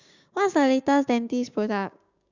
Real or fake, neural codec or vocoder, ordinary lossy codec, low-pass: fake; codec, 16 kHz, 6 kbps, DAC; Opus, 64 kbps; 7.2 kHz